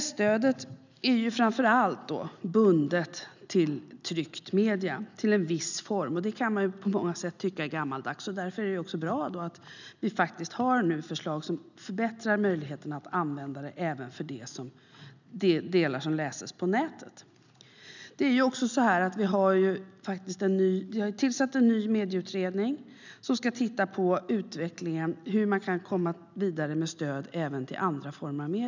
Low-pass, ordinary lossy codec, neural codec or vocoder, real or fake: 7.2 kHz; none; none; real